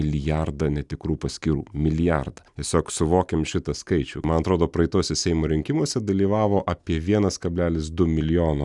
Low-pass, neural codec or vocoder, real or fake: 10.8 kHz; none; real